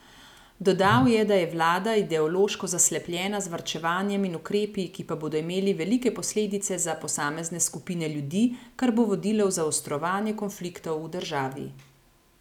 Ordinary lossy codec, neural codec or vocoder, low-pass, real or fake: none; none; 19.8 kHz; real